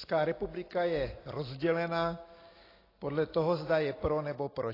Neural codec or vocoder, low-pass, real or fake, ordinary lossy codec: none; 5.4 kHz; real; AAC, 24 kbps